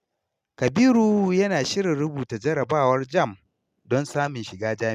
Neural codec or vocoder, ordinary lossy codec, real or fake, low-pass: none; MP3, 96 kbps; real; 14.4 kHz